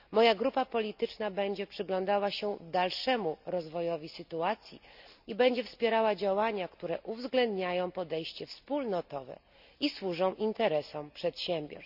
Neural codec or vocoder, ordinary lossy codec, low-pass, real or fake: none; none; 5.4 kHz; real